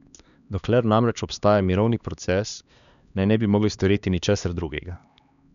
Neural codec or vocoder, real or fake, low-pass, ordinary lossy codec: codec, 16 kHz, 2 kbps, X-Codec, HuBERT features, trained on LibriSpeech; fake; 7.2 kHz; none